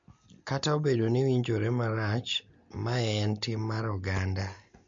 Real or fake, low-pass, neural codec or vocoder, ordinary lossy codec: real; 7.2 kHz; none; MP3, 48 kbps